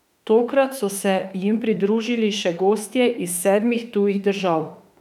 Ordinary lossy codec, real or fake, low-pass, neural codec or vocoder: none; fake; 19.8 kHz; autoencoder, 48 kHz, 32 numbers a frame, DAC-VAE, trained on Japanese speech